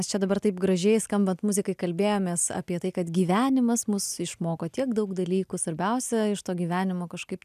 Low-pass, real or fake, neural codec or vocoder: 14.4 kHz; real; none